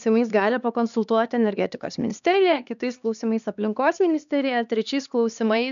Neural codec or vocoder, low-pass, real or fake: codec, 16 kHz, 2 kbps, X-Codec, HuBERT features, trained on LibriSpeech; 7.2 kHz; fake